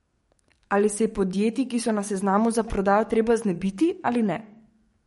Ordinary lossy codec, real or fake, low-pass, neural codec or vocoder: MP3, 48 kbps; fake; 19.8 kHz; codec, 44.1 kHz, 7.8 kbps, DAC